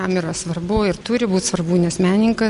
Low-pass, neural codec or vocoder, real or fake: 10.8 kHz; none; real